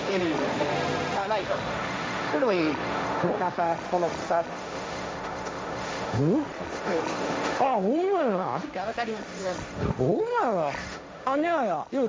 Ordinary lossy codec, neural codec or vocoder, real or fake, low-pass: none; codec, 16 kHz, 1.1 kbps, Voila-Tokenizer; fake; none